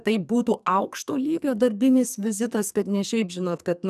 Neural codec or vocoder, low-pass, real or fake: codec, 44.1 kHz, 2.6 kbps, SNAC; 14.4 kHz; fake